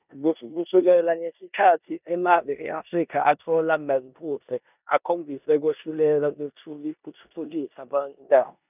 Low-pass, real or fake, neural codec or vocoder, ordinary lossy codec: 3.6 kHz; fake; codec, 16 kHz in and 24 kHz out, 0.9 kbps, LongCat-Audio-Codec, four codebook decoder; none